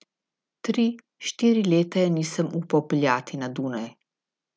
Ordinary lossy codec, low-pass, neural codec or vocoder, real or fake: none; none; none; real